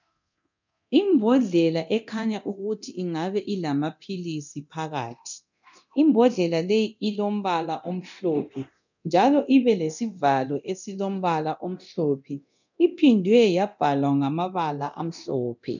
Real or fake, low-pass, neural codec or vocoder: fake; 7.2 kHz; codec, 24 kHz, 0.9 kbps, DualCodec